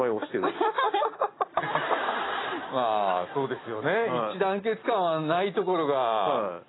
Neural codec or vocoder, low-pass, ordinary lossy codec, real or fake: none; 7.2 kHz; AAC, 16 kbps; real